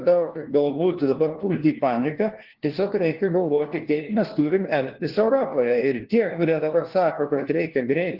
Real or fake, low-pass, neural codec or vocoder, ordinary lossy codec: fake; 5.4 kHz; codec, 16 kHz, 1 kbps, FunCodec, trained on LibriTTS, 50 frames a second; Opus, 16 kbps